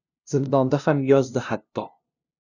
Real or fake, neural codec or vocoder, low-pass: fake; codec, 16 kHz, 0.5 kbps, FunCodec, trained on LibriTTS, 25 frames a second; 7.2 kHz